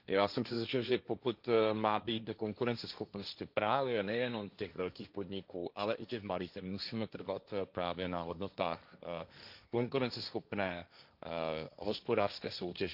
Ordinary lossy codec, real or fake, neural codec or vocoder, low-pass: none; fake; codec, 16 kHz, 1.1 kbps, Voila-Tokenizer; 5.4 kHz